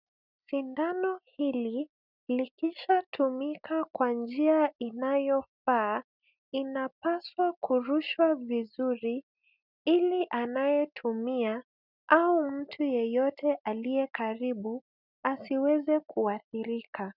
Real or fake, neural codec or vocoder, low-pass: real; none; 5.4 kHz